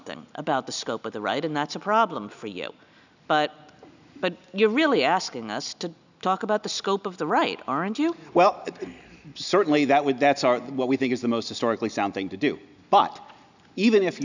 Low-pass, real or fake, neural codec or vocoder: 7.2 kHz; real; none